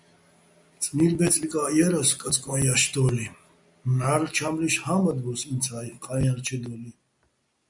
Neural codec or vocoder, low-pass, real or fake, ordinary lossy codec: none; 10.8 kHz; real; MP3, 96 kbps